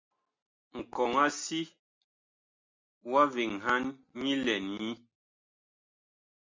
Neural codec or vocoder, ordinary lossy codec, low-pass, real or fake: none; MP3, 64 kbps; 7.2 kHz; real